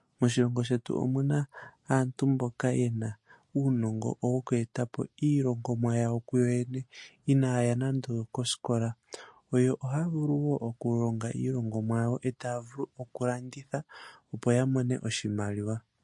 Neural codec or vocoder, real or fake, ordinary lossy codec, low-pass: none; real; MP3, 48 kbps; 10.8 kHz